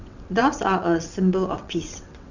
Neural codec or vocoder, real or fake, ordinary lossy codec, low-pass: vocoder, 22.05 kHz, 80 mel bands, WaveNeXt; fake; none; 7.2 kHz